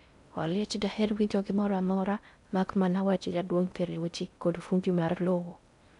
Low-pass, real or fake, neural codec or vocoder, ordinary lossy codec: 10.8 kHz; fake; codec, 16 kHz in and 24 kHz out, 0.6 kbps, FocalCodec, streaming, 4096 codes; none